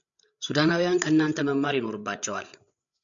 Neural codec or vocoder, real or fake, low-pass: codec, 16 kHz, 8 kbps, FreqCodec, larger model; fake; 7.2 kHz